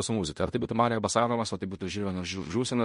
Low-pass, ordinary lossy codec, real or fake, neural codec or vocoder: 10.8 kHz; MP3, 48 kbps; fake; codec, 16 kHz in and 24 kHz out, 0.9 kbps, LongCat-Audio-Codec, fine tuned four codebook decoder